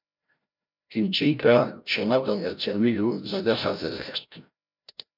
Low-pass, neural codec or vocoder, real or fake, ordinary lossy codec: 5.4 kHz; codec, 16 kHz, 0.5 kbps, FreqCodec, larger model; fake; MP3, 48 kbps